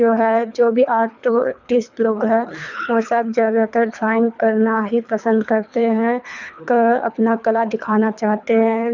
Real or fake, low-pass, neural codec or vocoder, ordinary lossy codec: fake; 7.2 kHz; codec, 24 kHz, 3 kbps, HILCodec; none